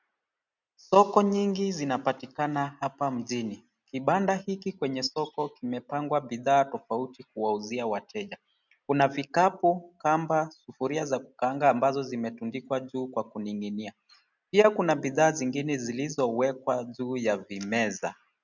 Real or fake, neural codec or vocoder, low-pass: real; none; 7.2 kHz